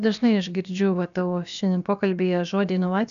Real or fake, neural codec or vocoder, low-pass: fake; codec, 16 kHz, about 1 kbps, DyCAST, with the encoder's durations; 7.2 kHz